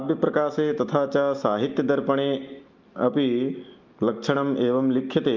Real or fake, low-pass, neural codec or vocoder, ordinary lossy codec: real; 7.2 kHz; none; Opus, 32 kbps